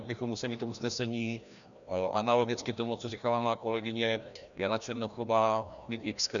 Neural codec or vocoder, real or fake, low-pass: codec, 16 kHz, 1 kbps, FreqCodec, larger model; fake; 7.2 kHz